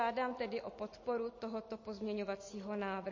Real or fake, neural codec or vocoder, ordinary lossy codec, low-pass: real; none; MP3, 32 kbps; 7.2 kHz